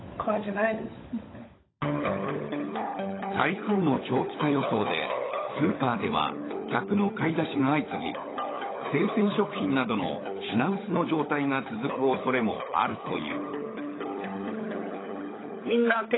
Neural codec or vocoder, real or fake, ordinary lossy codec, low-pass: codec, 16 kHz, 16 kbps, FunCodec, trained on LibriTTS, 50 frames a second; fake; AAC, 16 kbps; 7.2 kHz